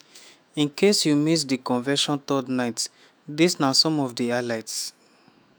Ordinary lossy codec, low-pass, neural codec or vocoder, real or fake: none; none; autoencoder, 48 kHz, 128 numbers a frame, DAC-VAE, trained on Japanese speech; fake